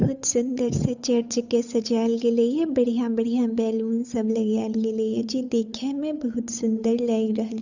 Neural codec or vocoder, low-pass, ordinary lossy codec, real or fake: codec, 16 kHz, 8 kbps, FunCodec, trained on Chinese and English, 25 frames a second; 7.2 kHz; none; fake